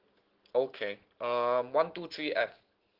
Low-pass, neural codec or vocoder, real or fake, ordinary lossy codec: 5.4 kHz; none; real; Opus, 16 kbps